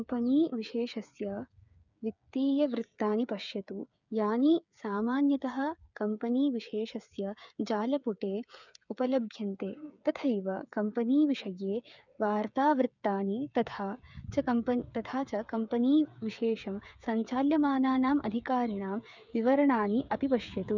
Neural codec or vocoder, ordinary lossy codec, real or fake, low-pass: codec, 16 kHz, 8 kbps, FreqCodec, smaller model; none; fake; 7.2 kHz